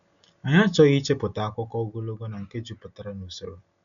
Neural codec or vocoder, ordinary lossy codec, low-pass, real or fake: none; none; 7.2 kHz; real